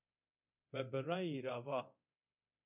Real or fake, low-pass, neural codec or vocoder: fake; 3.6 kHz; codec, 24 kHz, 0.9 kbps, DualCodec